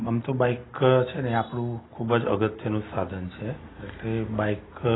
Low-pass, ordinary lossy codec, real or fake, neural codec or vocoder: 7.2 kHz; AAC, 16 kbps; real; none